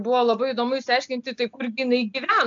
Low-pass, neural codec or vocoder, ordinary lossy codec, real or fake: 7.2 kHz; none; AAC, 64 kbps; real